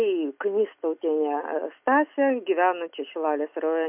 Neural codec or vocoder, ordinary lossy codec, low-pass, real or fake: none; MP3, 32 kbps; 3.6 kHz; real